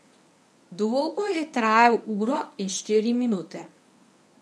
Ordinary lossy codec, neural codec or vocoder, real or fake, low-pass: none; codec, 24 kHz, 0.9 kbps, WavTokenizer, medium speech release version 1; fake; none